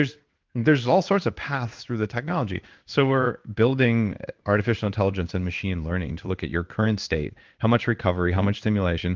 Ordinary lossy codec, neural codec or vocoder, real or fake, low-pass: Opus, 24 kbps; codec, 16 kHz in and 24 kHz out, 1 kbps, XY-Tokenizer; fake; 7.2 kHz